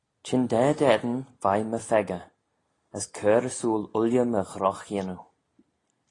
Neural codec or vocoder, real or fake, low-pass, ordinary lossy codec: none; real; 10.8 kHz; AAC, 32 kbps